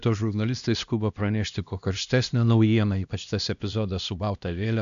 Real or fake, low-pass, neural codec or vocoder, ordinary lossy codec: fake; 7.2 kHz; codec, 16 kHz, 1 kbps, X-Codec, HuBERT features, trained on LibriSpeech; AAC, 96 kbps